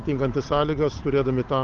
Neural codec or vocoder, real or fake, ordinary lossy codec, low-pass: codec, 16 kHz, 8 kbps, FunCodec, trained on Chinese and English, 25 frames a second; fake; Opus, 32 kbps; 7.2 kHz